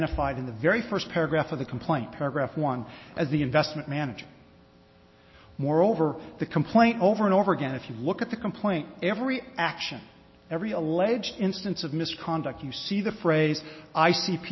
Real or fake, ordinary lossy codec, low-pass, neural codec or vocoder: real; MP3, 24 kbps; 7.2 kHz; none